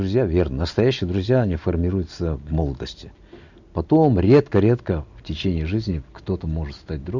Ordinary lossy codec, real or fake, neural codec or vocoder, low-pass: none; real; none; 7.2 kHz